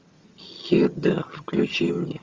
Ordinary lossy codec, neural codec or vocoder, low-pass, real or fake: Opus, 32 kbps; vocoder, 22.05 kHz, 80 mel bands, HiFi-GAN; 7.2 kHz; fake